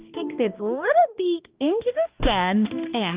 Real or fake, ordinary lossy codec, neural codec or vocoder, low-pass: fake; Opus, 24 kbps; codec, 16 kHz, 1 kbps, X-Codec, HuBERT features, trained on balanced general audio; 3.6 kHz